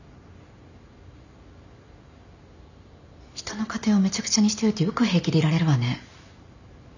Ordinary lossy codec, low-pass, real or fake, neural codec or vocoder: none; 7.2 kHz; real; none